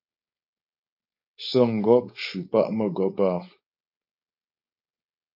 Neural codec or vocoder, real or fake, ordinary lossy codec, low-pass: codec, 16 kHz, 4.8 kbps, FACodec; fake; MP3, 32 kbps; 5.4 kHz